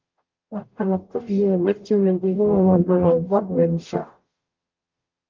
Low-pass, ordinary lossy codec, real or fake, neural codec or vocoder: 7.2 kHz; Opus, 24 kbps; fake; codec, 44.1 kHz, 0.9 kbps, DAC